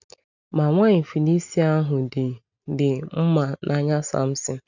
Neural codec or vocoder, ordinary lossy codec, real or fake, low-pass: none; none; real; 7.2 kHz